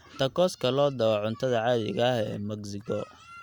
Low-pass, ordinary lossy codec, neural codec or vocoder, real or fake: 19.8 kHz; Opus, 64 kbps; none; real